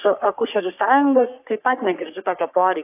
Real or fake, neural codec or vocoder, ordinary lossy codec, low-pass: fake; codec, 44.1 kHz, 3.4 kbps, Pupu-Codec; AAC, 32 kbps; 3.6 kHz